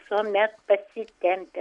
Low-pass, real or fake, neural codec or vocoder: 10.8 kHz; real; none